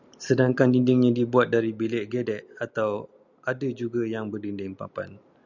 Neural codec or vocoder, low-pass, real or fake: none; 7.2 kHz; real